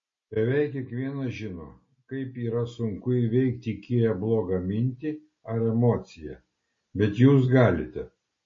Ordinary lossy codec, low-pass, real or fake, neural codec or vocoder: MP3, 32 kbps; 7.2 kHz; real; none